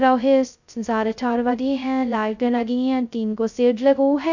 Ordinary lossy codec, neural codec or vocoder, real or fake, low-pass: none; codec, 16 kHz, 0.2 kbps, FocalCodec; fake; 7.2 kHz